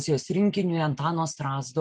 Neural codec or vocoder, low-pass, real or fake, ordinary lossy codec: none; 9.9 kHz; real; Opus, 32 kbps